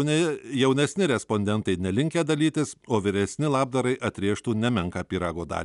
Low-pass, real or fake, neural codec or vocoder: 10.8 kHz; real; none